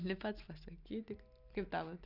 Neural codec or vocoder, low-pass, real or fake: none; 5.4 kHz; real